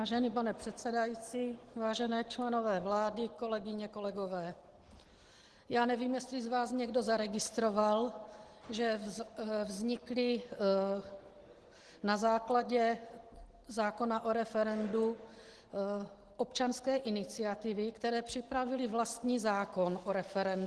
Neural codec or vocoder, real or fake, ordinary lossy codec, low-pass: none; real; Opus, 16 kbps; 10.8 kHz